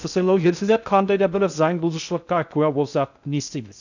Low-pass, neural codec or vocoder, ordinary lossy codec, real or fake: 7.2 kHz; codec, 16 kHz in and 24 kHz out, 0.6 kbps, FocalCodec, streaming, 2048 codes; none; fake